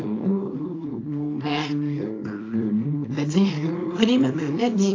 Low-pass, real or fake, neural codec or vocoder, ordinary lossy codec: 7.2 kHz; fake; codec, 24 kHz, 0.9 kbps, WavTokenizer, small release; AAC, 32 kbps